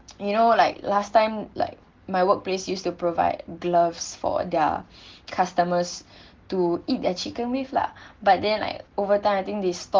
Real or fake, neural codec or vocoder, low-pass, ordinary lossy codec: real; none; 7.2 kHz; Opus, 16 kbps